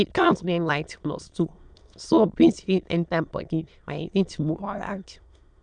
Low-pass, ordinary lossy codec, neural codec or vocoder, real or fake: 9.9 kHz; none; autoencoder, 22.05 kHz, a latent of 192 numbers a frame, VITS, trained on many speakers; fake